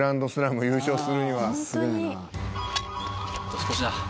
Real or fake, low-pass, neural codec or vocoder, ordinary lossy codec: real; none; none; none